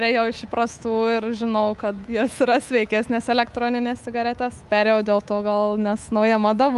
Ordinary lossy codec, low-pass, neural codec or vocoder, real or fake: Opus, 32 kbps; 10.8 kHz; codec, 24 kHz, 3.1 kbps, DualCodec; fake